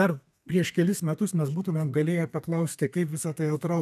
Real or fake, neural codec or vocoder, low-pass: fake; codec, 32 kHz, 1.9 kbps, SNAC; 14.4 kHz